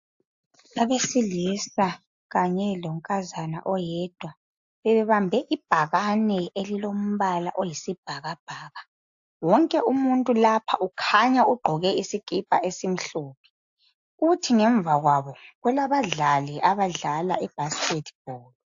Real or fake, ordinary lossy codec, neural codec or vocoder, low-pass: real; AAC, 64 kbps; none; 7.2 kHz